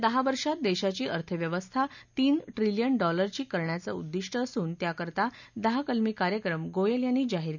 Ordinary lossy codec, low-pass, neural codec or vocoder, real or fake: none; 7.2 kHz; none; real